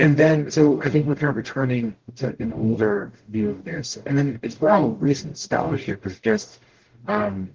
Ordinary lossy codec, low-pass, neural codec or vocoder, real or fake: Opus, 16 kbps; 7.2 kHz; codec, 44.1 kHz, 0.9 kbps, DAC; fake